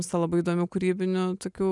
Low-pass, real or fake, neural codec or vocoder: 10.8 kHz; real; none